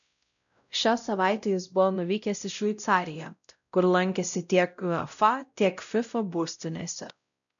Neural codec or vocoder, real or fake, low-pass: codec, 16 kHz, 0.5 kbps, X-Codec, WavLM features, trained on Multilingual LibriSpeech; fake; 7.2 kHz